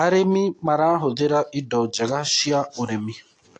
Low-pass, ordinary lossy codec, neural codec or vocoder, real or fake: 9.9 kHz; AAC, 48 kbps; none; real